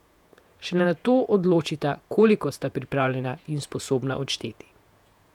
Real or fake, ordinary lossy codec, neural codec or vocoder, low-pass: fake; none; vocoder, 48 kHz, 128 mel bands, Vocos; 19.8 kHz